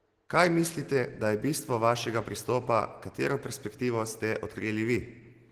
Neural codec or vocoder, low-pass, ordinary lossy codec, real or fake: none; 14.4 kHz; Opus, 16 kbps; real